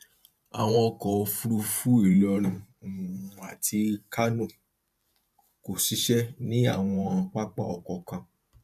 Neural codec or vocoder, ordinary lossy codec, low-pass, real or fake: vocoder, 44.1 kHz, 128 mel bands every 512 samples, BigVGAN v2; none; 14.4 kHz; fake